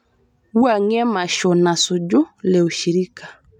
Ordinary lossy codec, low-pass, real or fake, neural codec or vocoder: none; 19.8 kHz; real; none